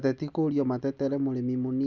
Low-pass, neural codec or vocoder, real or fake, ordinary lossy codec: 7.2 kHz; none; real; none